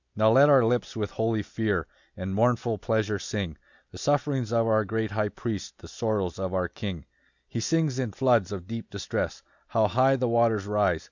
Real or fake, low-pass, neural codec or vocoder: real; 7.2 kHz; none